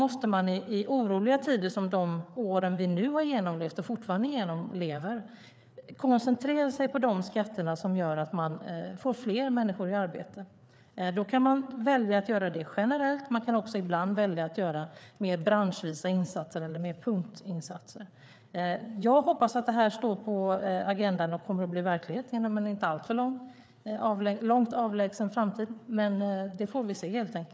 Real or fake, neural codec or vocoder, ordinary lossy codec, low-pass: fake; codec, 16 kHz, 4 kbps, FreqCodec, larger model; none; none